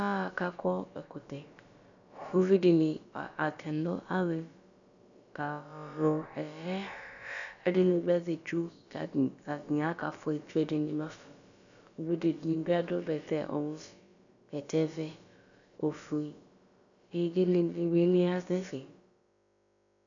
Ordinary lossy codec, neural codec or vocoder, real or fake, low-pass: MP3, 96 kbps; codec, 16 kHz, about 1 kbps, DyCAST, with the encoder's durations; fake; 7.2 kHz